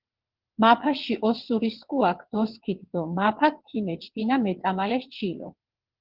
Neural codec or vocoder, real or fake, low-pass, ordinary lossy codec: vocoder, 22.05 kHz, 80 mel bands, WaveNeXt; fake; 5.4 kHz; Opus, 16 kbps